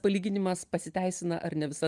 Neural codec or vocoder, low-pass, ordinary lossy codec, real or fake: none; 10.8 kHz; Opus, 32 kbps; real